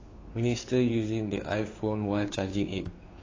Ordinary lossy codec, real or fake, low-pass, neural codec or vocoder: AAC, 32 kbps; fake; 7.2 kHz; codec, 16 kHz, 2 kbps, FunCodec, trained on Chinese and English, 25 frames a second